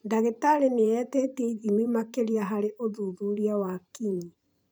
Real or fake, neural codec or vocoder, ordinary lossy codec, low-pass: fake; vocoder, 44.1 kHz, 128 mel bands every 512 samples, BigVGAN v2; none; none